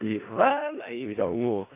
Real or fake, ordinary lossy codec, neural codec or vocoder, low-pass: fake; AAC, 24 kbps; codec, 16 kHz in and 24 kHz out, 0.4 kbps, LongCat-Audio-Codec, four codebook decoder; 3.6 kHz